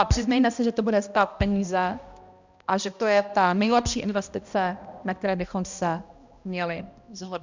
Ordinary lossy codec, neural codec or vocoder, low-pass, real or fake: Opus, 64 kbps; codec, 16 kHz, 1 kbps, X-Codec, HuBERT features, trained on balanced general audio; 7.2 kHz; fake